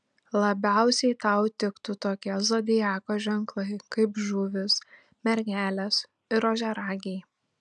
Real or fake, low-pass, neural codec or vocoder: real; 9.9 kHz; none